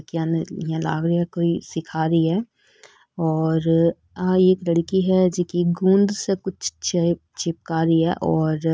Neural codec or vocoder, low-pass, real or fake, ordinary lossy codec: none; none; real; none